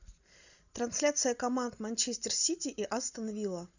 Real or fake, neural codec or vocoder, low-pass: real; none; 7.2 kHz